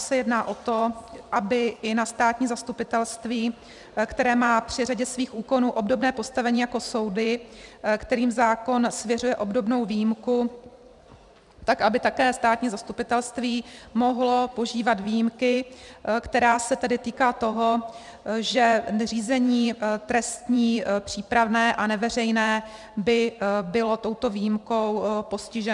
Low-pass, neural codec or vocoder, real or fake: 10.8 kHz; vocoder, 44.1 kHz, 128 mel bands every 512 samples, BigVGAN v2; fake